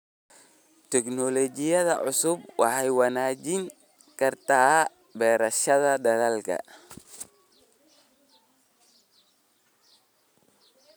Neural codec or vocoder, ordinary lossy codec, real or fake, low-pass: none; none; real; none